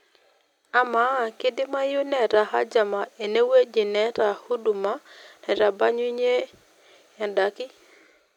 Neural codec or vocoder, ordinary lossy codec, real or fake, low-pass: none; none; real; 19.8 kHz